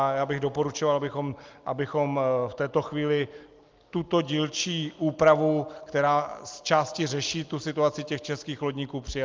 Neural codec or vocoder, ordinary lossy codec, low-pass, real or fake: none; Opus, 24 kbps; 7.2 kHz; real